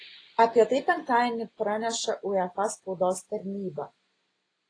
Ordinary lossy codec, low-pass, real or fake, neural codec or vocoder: AAC, 32 kbps; 9.9 kHz; real; none